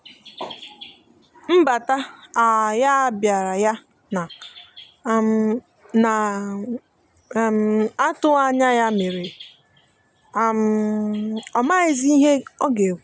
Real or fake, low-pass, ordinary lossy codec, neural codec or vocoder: real; none; none; none